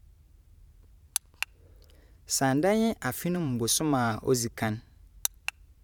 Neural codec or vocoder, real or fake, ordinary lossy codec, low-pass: vocoder, 44.1 kHz, 128 mel bands every 512 samples, BigVGAN v2; fake; none; 19.8 kHz